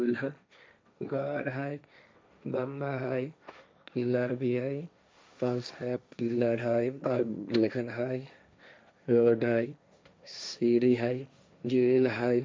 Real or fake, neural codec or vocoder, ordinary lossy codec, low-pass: fake; codec, 16 kHz, 1.1 kbps, Voila-Tokenizer; none; none